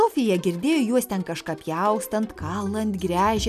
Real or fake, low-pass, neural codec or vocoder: real; 14.4 kHz; none